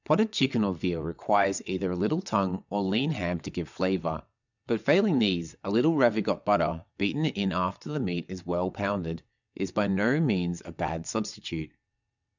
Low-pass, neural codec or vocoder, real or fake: 7.2 kHz; vocoder, 22.05 kHz, 80 mel bands, WaveNeXt; fake